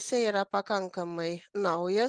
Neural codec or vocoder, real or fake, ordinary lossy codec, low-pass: none; real; Opus, 32 kbps; 9.9 kHz